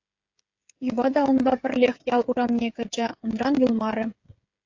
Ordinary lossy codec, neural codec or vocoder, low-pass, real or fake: AAC, 48 kbps; codec, 16 kHz, 8 kbps, FreqCodec, smaller model; 7.2 kHz; fake